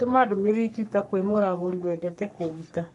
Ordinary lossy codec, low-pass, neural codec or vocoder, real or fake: none; 10.8 kHz; codec, 44.1 kHz, 3.4 kbps, Pupu-Codec; fake